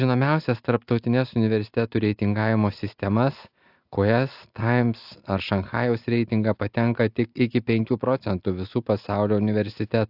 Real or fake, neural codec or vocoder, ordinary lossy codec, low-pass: real; none; AAC, 48 kbps; 5.4 kHz